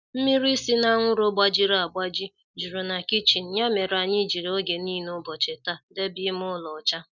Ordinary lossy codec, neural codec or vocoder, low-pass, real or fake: none; none; 7.2 kHz; real